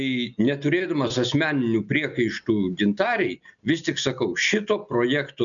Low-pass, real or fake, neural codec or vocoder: 7.2 kHz; real; none